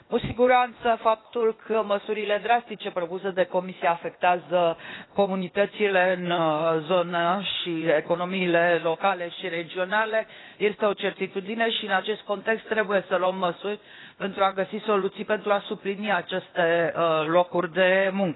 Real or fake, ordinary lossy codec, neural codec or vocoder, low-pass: fake; AAC, 16 kbps; codec, 16 kHz, 0.8 kbps, ZipCodec; 7.2 kHz